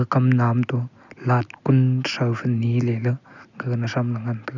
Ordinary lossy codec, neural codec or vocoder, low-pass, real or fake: none; none; 7.2 kHz; real